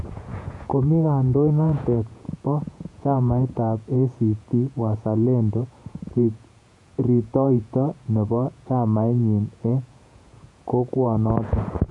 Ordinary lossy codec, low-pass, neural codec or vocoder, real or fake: none; 10.8 kHz; vocoder, 48 kHz, 128 mel bands, Vocos; fake